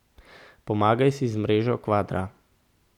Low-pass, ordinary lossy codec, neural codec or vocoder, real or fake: 19.8 kHz; none; none; real